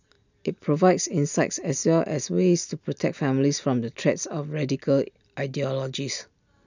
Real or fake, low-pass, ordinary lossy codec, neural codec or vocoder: real; 7.2 kHz; none; none